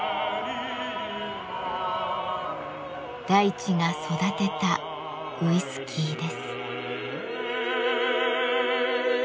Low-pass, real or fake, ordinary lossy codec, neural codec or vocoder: none; real; none; none